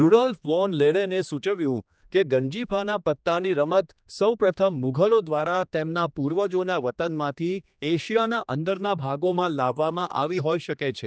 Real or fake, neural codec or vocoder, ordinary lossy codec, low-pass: fake; codec, 16 kHz, 2 kbps, X-Codec, HuBERT features, trained on general audio; none; none